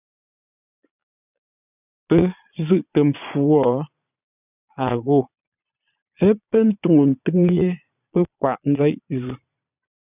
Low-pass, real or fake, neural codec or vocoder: 3.6 kHz; fake; vocoder, 22.05 kHz, 80 mel bands, Vocos